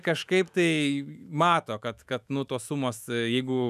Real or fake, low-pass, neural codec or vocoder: fake; 14.4 kHz; autoencoder, 48 kHz, 128 numbers a frame, DAC-VAE, trained on Japanese speech